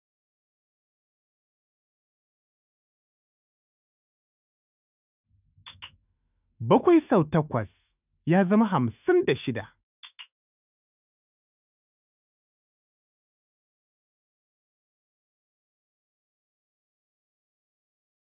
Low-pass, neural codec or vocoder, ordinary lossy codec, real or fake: 3.6 kHz; autoencoder, 48 kHz, 128 numbers a frame, DAC-VAE, trained on Japanese speech; none; fake